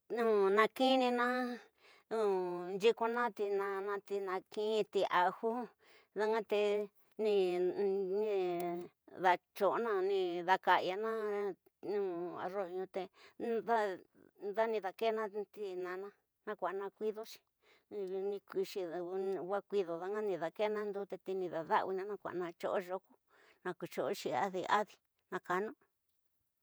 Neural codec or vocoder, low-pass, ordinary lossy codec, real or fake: vocoder, 48 kHz, 128 mel bands, Vocos; none; none; fake